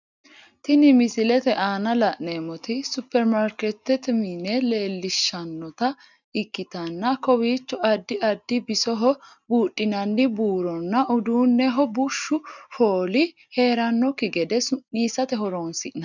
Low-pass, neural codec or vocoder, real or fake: 7.2 kHz; none; real